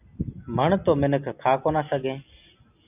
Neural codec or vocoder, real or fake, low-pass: none; real; 3.6 kHz